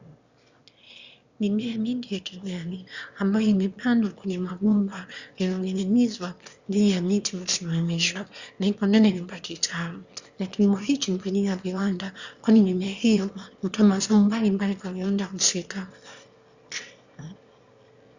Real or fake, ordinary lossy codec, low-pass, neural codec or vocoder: fake; Opus, 64 kbps; 7.2 kHz; autoencoder, 22.05 kHz, a latent of 192 numbers a frame, VITS, trained on one speaker